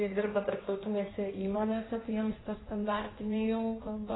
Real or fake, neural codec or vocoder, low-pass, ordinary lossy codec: fake; codec, 16 kHz in and 24 kHz out, 1.1 kbps, FireRedTTS-2 codec; 7.2 kHz; AAC, 16 kbps